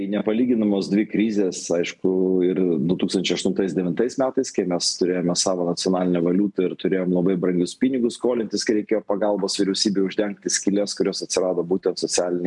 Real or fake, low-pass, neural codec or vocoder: real; 10.8 kHz; none